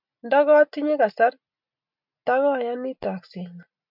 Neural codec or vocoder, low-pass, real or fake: none; 5.4 kHz; real